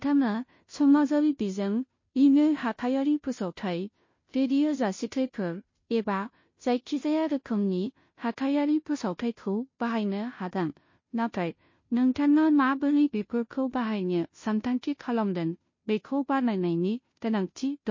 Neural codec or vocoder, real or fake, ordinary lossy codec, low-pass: codec, 16 kHz, 0.5 kbps, FunCodec, trained on Chinese and English, 25 frames a second; fake; MP3, 32 kbps; 7.2 kHz